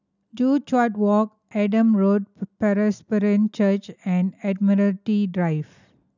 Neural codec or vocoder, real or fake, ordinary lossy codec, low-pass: none; real; none; 7.2 kHz